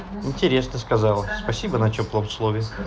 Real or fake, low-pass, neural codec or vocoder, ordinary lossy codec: real; none; none; none